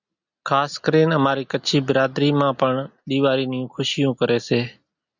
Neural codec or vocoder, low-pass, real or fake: none; 7.2 kHz; real